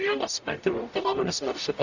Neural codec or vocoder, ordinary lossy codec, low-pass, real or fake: codec, 44.1 kHz, 0.9 kbps, DAC; Opus, 64 kbps; 7.2 kHz; fake